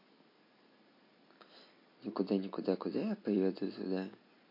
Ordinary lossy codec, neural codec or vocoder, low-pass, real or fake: MP3, 32 kbps; none; 5.4 kHz; real